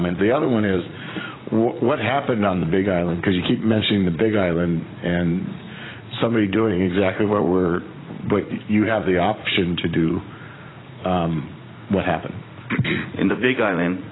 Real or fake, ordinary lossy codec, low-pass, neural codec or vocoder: fake; AAC, 16 kbps; 7.2 kHz; vocoder, 44.1 kHz, 80 mel bands, Vocos